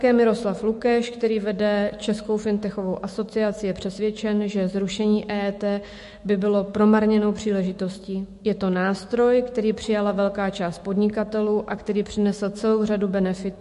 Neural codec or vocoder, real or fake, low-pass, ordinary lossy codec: autoencoder, 48 kHz, 128 numbers a frame, DAC-VAE, trained on Japanese speech; fake; 14.4 kHz; MP3, 48 kbps